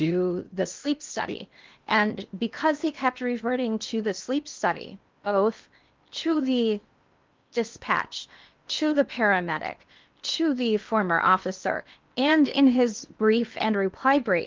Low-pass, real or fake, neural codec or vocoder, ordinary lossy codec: 7.2 kHz; fake; codec, 16 kHz in and 24 kHz out, 0.8 kbps, FocalCodec, streaming, 65536 codes; Opus, 24 kbps